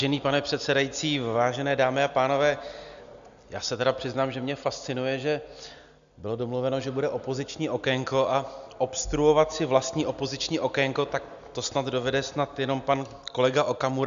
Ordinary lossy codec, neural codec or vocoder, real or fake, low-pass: AAC, 96 kbps; none; real; 7.2 kHz